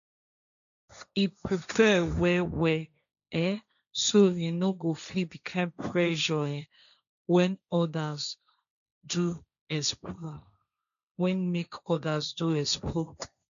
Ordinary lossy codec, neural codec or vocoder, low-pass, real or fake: MP3, 96 kbps; codec, 16 kHz, 1.1 kbps, Voila-Tokenizer; 7.2 kHz; fake